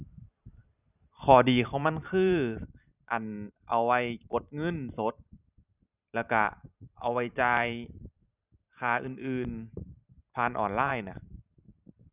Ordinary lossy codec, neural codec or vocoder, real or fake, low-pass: none; vocoder, 44.1 kHz, 128 mel bands every 512 samples, BigVGAN v2; fake; 3.6 kHz